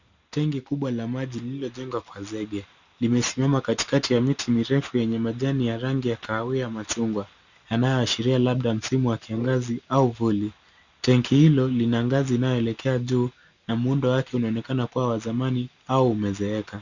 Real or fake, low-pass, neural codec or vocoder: real; 7.2 kHz; none